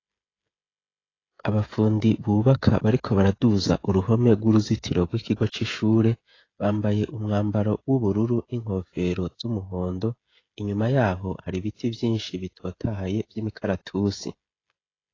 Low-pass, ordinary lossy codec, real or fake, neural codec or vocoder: 7.2 kHz; AAC, 32 kbps; fake; codec, 16 kHz, 16 kbps, FreqCodec, smaller model